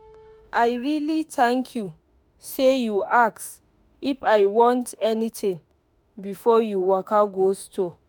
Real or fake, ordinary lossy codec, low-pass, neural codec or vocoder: fake; none; none; autoencoder, 48 kHz, 32 numbers a frame, DAC-VAE, trained on Japanese speech